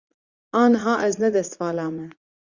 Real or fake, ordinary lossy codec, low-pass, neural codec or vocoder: fake; Opus, 64 kbps; 7.2 kHz; vocoder, 44.1 kHz, 80 mel bands, Vocos